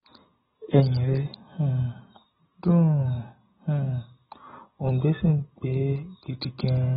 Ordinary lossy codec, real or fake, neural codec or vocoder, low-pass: AAC, 16 kbps; real; none; 7.2 kHz